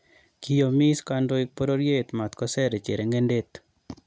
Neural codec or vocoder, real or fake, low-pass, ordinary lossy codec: none; real; none; none